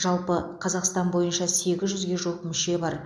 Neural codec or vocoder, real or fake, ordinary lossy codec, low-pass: none; real; none; none